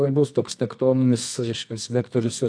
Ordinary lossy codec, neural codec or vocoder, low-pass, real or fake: AAC, 64 kbps; codec, 24 kHz, 0.9 kbps, WavTokenizer, medium music audio release; 9.9 kHz; fake